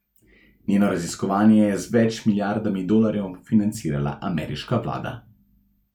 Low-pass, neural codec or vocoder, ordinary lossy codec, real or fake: 19.8 kHz; none; none; real